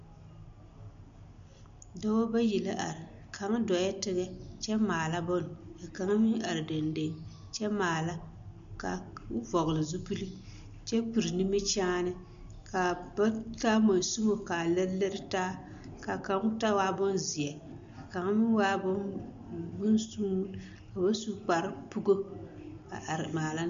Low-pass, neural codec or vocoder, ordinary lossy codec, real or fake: 7.2 kHz; none; MP3, 64 kbps; real